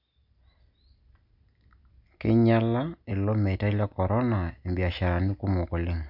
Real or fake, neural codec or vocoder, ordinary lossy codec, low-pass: real; none; none; 5.4 kHz